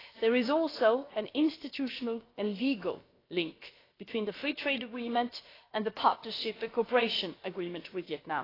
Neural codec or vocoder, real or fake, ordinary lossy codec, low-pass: codec, 16 kHz, about 1 kbps, DyCAST, with the encoder's durations; fake; AAC, 24 kbps; 5.4 kHz